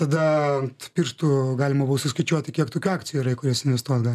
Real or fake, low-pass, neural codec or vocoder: real; 14.4 kHz; none